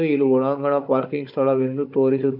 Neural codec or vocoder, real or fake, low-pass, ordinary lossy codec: codec, 16 kHz, 16 kbps, FunCodec, trained on Chinese and English, 50 frames a second; fake; 5.4 kHz; none